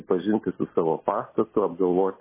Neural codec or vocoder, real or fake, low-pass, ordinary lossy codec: none; real; 3.6 kHz; MP3, 16 kbps